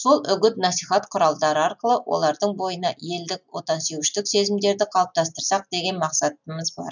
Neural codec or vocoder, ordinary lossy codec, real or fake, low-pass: none; none; real; 7.2 kHz